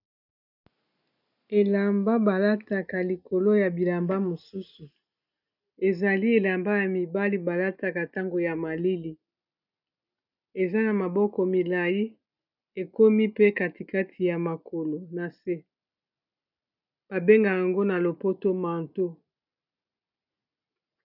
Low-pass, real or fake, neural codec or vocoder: 5.4 kHz; real; none